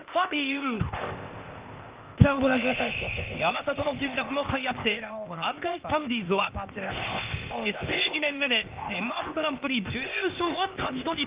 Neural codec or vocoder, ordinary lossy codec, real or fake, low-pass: codec, 16 kHz, 0.8 kbps, ZipCodec; Opus, 24 kbps; fake; 3.6 kHz